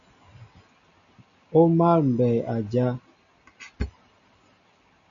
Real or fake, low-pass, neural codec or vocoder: real; 7.2 kHz; none